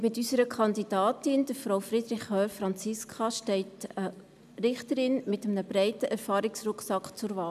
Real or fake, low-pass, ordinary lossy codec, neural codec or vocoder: real; 14.4 kHz; none; none